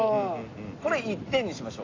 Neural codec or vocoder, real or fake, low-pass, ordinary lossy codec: none; real; 7.2 kHz; AAC, 32 kbps